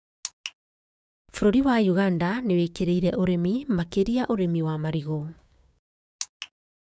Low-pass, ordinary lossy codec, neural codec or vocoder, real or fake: none; none; codec, 16 kHz, 6 kbps, DAC; fake